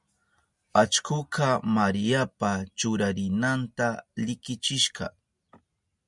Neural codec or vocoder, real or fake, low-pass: none; real; 10.8 kHz